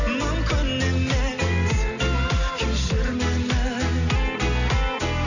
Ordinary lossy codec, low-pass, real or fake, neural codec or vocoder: none; 7.2 kHz; real; none